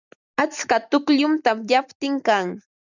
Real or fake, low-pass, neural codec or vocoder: real; 7.2 kHz; none